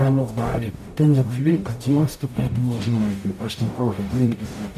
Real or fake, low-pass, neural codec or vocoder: fake; 14.4 kHz; codec, 44.1 kHz, 0.9 kbps, DAC